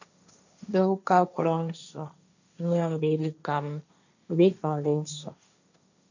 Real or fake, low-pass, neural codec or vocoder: fake; 7.2 kHz; codec, 16 kHz, 1.1 kbps, Voila-Tokenizer